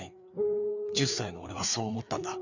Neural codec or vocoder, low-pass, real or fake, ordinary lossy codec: codec, 16 kHz, 4 kbps, FreqCodec, larger model; 7.2 kHz; fake; none